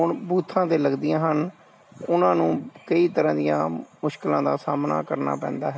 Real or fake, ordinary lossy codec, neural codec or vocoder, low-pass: real; none; none; none